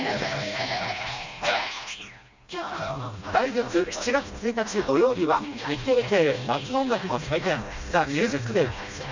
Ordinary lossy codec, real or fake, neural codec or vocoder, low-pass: MP3, 64 kbps; fake; codec, 16 kHz, 1 kbps, FreqCodec, smaller model; 7.2 kHz